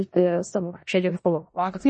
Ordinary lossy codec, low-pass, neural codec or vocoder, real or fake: MP3, 32 kbps; 10.8 kHz; codec, 16 kHz in and 24 kHz out, 0.4 kbps, LongCat-Audio-Codec, four codebook decoder; fake